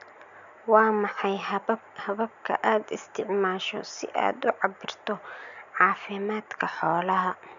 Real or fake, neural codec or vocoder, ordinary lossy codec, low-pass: real; none; none; 7.2 kHz